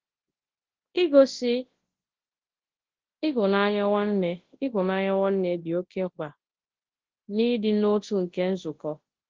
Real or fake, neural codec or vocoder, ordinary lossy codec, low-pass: fake; codec, 24 kHz, 0.9 kbps, WavTokenizer, large speech release; Opus, 16 kbps; 7.2 kHz